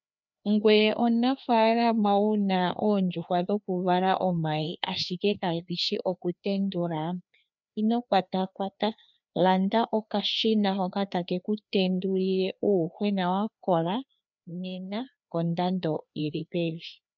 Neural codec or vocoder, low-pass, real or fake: codec, 16 kHz, 2 kbps, FreqCodec, larger model; 7.2 kHz; fake